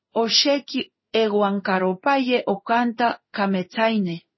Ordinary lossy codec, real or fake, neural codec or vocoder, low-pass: MP3, 24 kbps; fake; codec, 16 kHz in and 24 kHz out, 1 kbps, XY-Tokenizer; 7.2 kHz